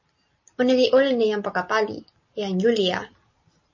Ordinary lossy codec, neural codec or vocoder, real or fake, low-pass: MP3, 32 kbps; none; real; 7.2 kHz